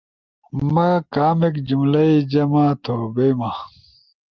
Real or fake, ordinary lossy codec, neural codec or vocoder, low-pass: real; Opus, 32 kbps; none; 7.2 kHz